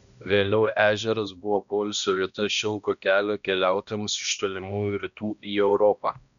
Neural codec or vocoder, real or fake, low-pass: codec, 16 kHz, 1 kbps, X-Codec, HuBERT features, trained on balanced general audio; fake; 7.2 kHz